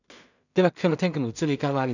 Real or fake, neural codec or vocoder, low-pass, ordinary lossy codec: fake; codec, 16 kHz in and 24 kHz out, 0.4 kbps, LongCat-Audio-Codec, two codebook decoder; 7.2 kHz; none